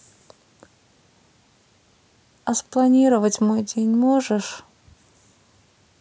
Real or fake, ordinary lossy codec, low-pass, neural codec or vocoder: real; none; none; none